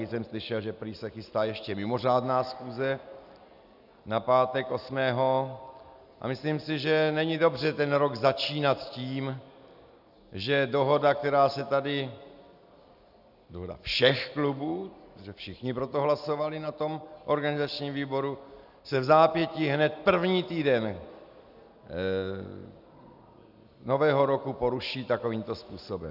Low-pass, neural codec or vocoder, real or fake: 5.4 kHz; none; real